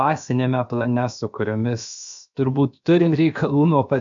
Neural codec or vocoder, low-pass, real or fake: codec, 16 kHz, about 1 kbps, DyCAST, with the encoder's durations; 7.2 kHz; fake